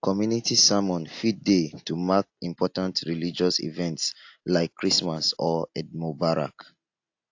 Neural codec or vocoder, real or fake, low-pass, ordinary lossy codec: none; real; 7.2 kHz; AAC, 48 kbps